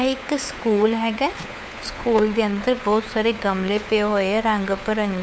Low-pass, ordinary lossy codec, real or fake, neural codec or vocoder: none; none; fake; codec, 16 kHz, 4 kbps, FunCodec, trained on LibriTTS, 50 frames a second